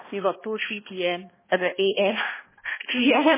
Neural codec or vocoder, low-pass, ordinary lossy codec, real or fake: codec, 16 kHz, 1 kbps, X-Codec, HuBERT features, trained on balanced general audio; 3.6 kHz; MP3, 16 kbps; fake